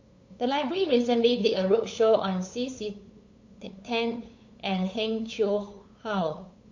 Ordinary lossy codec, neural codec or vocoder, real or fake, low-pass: AAC, 48 kbps; codec, 16 kHz, 8 kbps, FunCodec, trained on LibriTTS, 25 frames a second; fake; 7.2 kHz